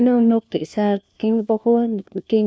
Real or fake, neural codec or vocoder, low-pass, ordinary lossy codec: fake; codec, 16 kHz, 1 kbps, FunCodec, trained on LibriTTS, 50 frames a second; none; none